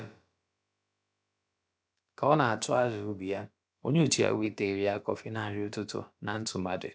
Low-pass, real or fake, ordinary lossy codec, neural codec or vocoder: none; fake; none; codec, 16 kHz, about 1 kbps, DyCAST, with the encoder's durations